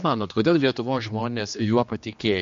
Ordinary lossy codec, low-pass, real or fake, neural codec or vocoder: MP3, 48 kbps; 7.2 kHz; fake; codec, 16 kHz, 2 kbps, X-Codec, HuBERT features, trained on general audio